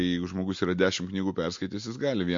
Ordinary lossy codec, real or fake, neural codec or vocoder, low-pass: MP3, 48 kbps; real; none; 7.2 kHz